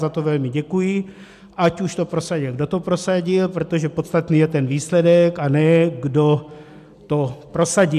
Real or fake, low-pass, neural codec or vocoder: real; 14.4 kHz; none